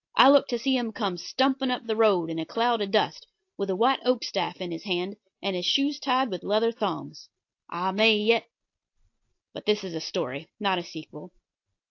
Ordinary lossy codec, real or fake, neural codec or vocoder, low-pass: AAC, 48 kbps; real; none; 7.2 kHz